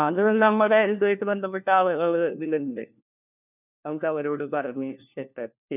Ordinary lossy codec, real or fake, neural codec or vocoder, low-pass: none; fake; codec, 16 kHz, 1 kbps, FunCodec, trained on LibriTTS, 50 frames a second; 3.6 kHz